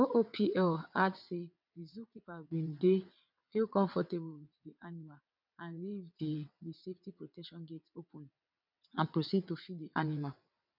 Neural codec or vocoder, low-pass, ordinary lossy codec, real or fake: vocoder, 22.05 kHz, 80 mel bands, WaveNeXt; 5.4 kHz; none; fake